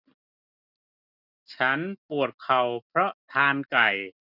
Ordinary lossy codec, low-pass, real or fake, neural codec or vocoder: none; 5.4 kHz; real; none